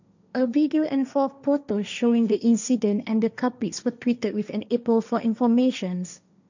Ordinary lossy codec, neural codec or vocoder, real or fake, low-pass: none; codec, 16 kHz, 1.1 kbps, Voila-Tokenizer; fake; 7.2 kHz